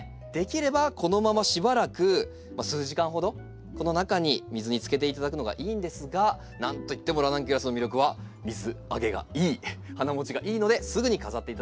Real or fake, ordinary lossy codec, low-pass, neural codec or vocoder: real; none; none; none